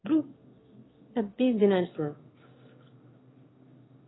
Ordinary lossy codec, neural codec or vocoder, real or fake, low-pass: AAC, 16 kbps; autoencoder, 22.05 kHz, a latent of 192 numbers a frame, VITS, trained on one speaker; fake; 7.2 kHz